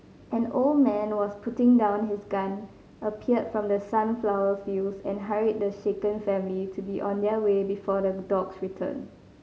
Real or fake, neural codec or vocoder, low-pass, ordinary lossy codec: real; none; none; none